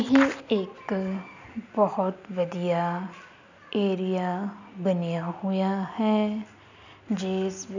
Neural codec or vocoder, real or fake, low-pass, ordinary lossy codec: none; real; 7.2 kHz; none